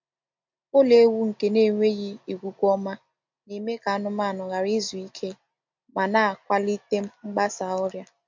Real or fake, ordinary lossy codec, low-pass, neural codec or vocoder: real; MP3, 48 kbps; 7.2 kHz; none